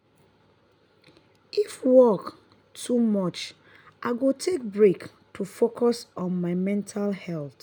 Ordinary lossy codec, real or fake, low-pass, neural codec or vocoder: none; real; none; none